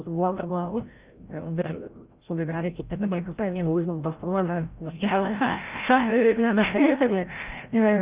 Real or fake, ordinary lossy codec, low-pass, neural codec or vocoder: fake; Opus, 32 kbps; 3.6 kHz; codec, 16 kHz, 0.5 kbps, FreqCodec, larger model